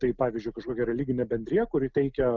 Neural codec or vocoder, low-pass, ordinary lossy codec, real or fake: none; 7.2 kHz; Opus, 24 kbps; real